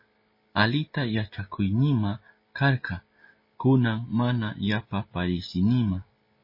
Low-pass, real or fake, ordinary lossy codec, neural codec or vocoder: 5.4 kHz; real; MP3, 24 kbps; none